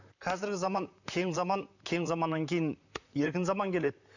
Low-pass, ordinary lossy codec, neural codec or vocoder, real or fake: 7.2 kHz; none; vocoder, 44.1 kHz, 128 mel bands, Pupu-Vocoder; fake